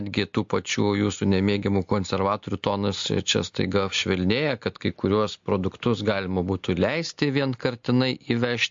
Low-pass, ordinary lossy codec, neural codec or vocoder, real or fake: 7.2 kHz; MP3, 48 kbps; none; real